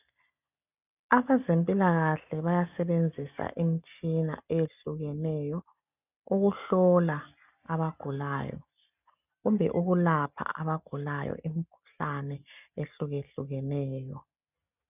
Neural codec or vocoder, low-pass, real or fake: none; 3.6 kHz; real